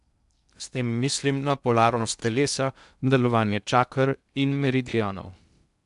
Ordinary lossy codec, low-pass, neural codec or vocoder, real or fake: none; 10.8 kHz; codec, 16 kHz in and 24 kHz out, 0.6 kbps, FocalCodec, streaming, 2048 codes; fake